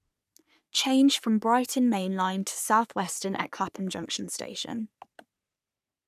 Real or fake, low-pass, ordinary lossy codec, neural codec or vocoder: fake; 14.4 kHz; none; codec, 44.1 kHz, 3.4 kbps, Pupu-Codec